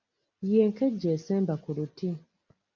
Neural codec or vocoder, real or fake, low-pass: none; real; 7.2 kHz